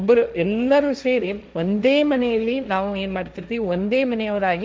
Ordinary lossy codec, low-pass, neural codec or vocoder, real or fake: none; none; codec, 16 kHz, 1.1 kbps, Voila-Tokenizer; fake